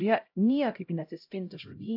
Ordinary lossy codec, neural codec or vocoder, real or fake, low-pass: AAC, 48 kbps; codec, 16 kHz, 0.5 kbps, X-Codec, HuBERT features, trained on LibriSpeech; fake; 5.4 kHz